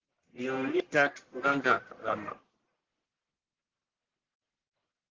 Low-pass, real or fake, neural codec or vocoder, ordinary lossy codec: 7.2 kHz; fake; codec, 44.1 kHz, 1.7 kbps, Pupu-Codec; Opus, 16 kbps